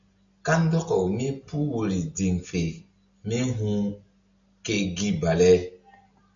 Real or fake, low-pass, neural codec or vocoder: real; 7.2 kHz; none